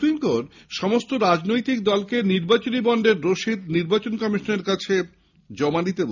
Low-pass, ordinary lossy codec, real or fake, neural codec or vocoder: 7.2 kHz; none; real; none